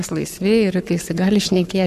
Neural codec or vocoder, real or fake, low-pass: codec, 44.1 kHz, 7.8 kbps, Pupu-Codec; fake; 14.4 kHz